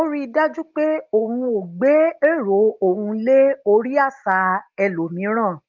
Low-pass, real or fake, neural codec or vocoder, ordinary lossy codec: 7.2 kHz; real; none; Opus, 32 kbps